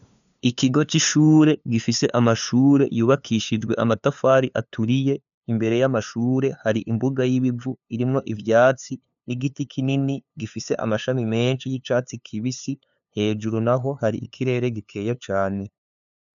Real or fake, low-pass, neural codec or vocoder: fake; 7.2 kHz; codec, 16 kHz, 2 kbps, FunCodec, trained on LibriTTS, 25 frames a second